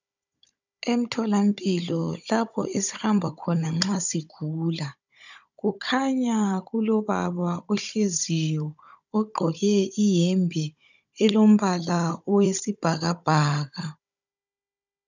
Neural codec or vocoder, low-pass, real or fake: codec, 16 kHz, 16 kbps, FunCodec, trained on Chinese and English, 50 frames a second; 7.2 kHz; fake